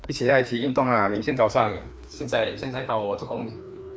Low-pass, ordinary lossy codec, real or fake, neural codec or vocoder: none; none; fake; codec, 16 kHz, 2 kbps, FreqCodec, larger model